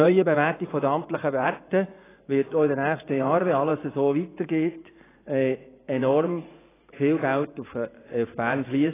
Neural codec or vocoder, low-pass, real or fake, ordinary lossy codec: vocoder, 24 kHz, 100 mel bands, Vocos; 3.6 kHz; fake; AAC, 16 kbps